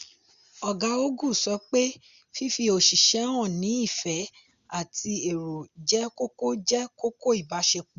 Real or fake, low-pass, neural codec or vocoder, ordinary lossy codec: real; 7.2 kHz; none; Opus, 64 kbps